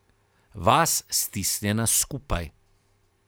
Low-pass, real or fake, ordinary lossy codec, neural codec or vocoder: none; fake; none; vocoder, 44.1 kHz, 128 mel bands every 512 samples, BigVGAN v2